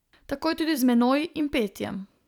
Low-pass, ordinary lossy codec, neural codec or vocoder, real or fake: 19.8 kHz; none; vocoder, 44.1 kHz, 128 mel bands every 256 samples, BigVGAN v2; fake